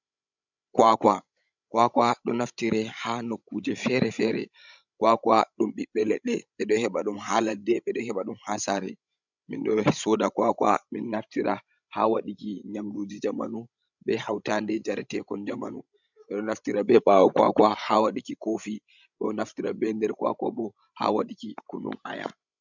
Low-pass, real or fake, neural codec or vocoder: 7.2 kHz; fake; codec, 16 kHz, 16 kbps, FreqCodec, larger model